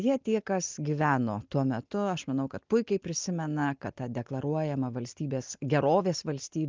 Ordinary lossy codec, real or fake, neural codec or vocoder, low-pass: Opus, 32 kbps; real; none; 7.2 kHz